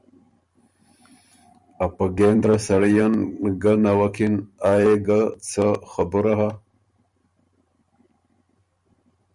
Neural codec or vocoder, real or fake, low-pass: vocoder, 44.1 kHz, 128 mel bands every 512 samples, BigVGAN v2; fake; 10.8 kHz